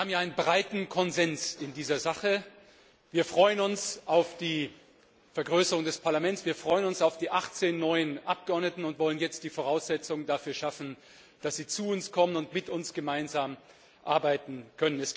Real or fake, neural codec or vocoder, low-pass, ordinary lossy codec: real; none; none; none